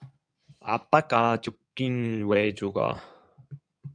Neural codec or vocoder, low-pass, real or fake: codec, 16 kHz in and 24 kHz out, 2.2 kbps, FireRedTTS-2 codec; 9.9 kHz; fake